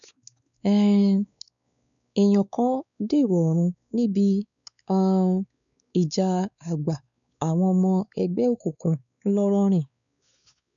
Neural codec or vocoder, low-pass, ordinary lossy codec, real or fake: codec, 16 kHz, 4 kbps, X-Codec, WavLM features, trained on Multilingual LibriSpeech; 7.2 kHz; none; fake